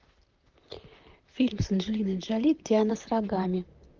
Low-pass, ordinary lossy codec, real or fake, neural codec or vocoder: 7.2 kHz; Opus, 32 kbps; fake; vocoder, 44.1 kHz, 128 mel bands, Pupu-Vocoder